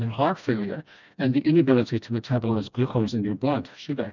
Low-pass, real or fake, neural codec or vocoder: 7.2 kHz; fake; codec, 16 kHz, 1 kbps, FreqCodec, smaller model